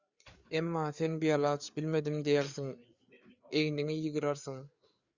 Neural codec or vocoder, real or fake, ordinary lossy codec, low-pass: codec, 16 kHz, 4 kbps, FreqCodec, larger model; fake; Opus, 64 kbps; 7.2 kHz